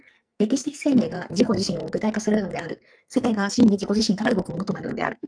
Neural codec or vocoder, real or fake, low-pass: codec, 44.1 kHz, 2.6 kbps, SNAC; fake; 9.9 kHz